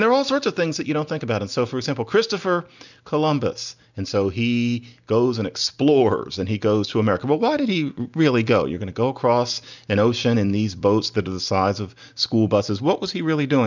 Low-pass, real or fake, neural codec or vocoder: 7.2 kHz; real; none